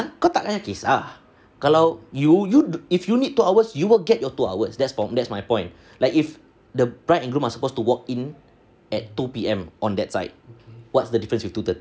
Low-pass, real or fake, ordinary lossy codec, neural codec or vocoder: none; real; none; none